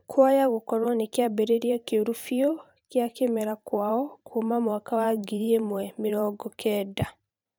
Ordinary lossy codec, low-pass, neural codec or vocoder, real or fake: none; none; vocoder, 44.1 kHz, 128 mel bands every 512 samples, BigVGAN v2; fake